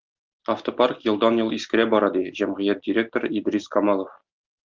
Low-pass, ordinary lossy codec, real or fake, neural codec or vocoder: 7.2 kHz; Opus, 32 kbps; real; none